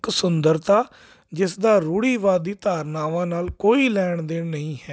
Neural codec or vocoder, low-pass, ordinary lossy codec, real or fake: none; none; none; real